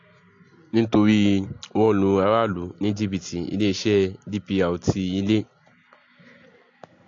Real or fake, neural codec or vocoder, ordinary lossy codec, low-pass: real; none; AAC, 48 kbps; 7.2 kHz